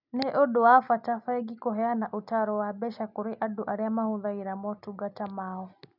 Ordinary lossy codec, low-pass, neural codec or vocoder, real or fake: none; 5.4 kHz; none; real